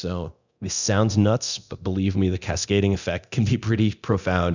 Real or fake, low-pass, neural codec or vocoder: fake; 7.2 kHz; codec, 24 kHz, 0.9 kbps, DualCodec